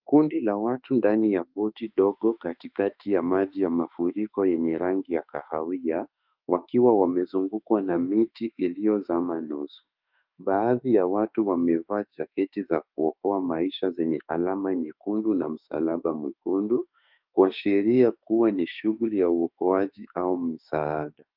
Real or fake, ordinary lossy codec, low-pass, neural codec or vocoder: fake; Opus, 24 kbps; 5.4 kHz; autoencoder, 48 kHz, 32 numbers a frame, DAC-VAE, trained on Japanese speech